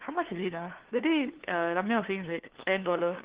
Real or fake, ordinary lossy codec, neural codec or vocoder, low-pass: fake; Opus, 16 kbps; codec, 16 kHz, 2 kbps, FunCodec, trained on LibriTTS, 25 frames a second; 3.6 kHz